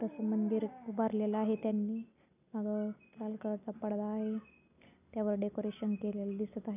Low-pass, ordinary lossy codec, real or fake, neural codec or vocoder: 3.6 kHz; none; real; none